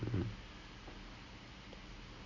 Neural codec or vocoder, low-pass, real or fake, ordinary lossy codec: none; 7.2 kHz; real; MP3, 32 kbps